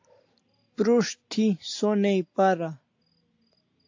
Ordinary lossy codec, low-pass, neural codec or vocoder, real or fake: AAC, 48 kbps; 7.2 kHz; none; real